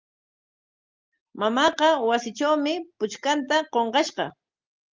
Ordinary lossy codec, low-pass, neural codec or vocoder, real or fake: Opus, 24 kbps; 7.2 kHz; none; real